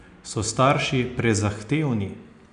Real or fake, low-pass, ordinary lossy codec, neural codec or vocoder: real; 9.9 kHz; MP3, 96 kbps; none